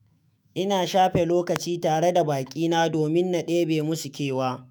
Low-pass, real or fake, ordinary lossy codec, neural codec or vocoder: none; fake; none; autoencoder, 48 kHz, 128 numbers a frame, DAC-VAE, trained on Japanese speech